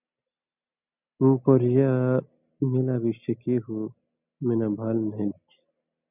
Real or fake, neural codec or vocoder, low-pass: real; none; 3.6 kHz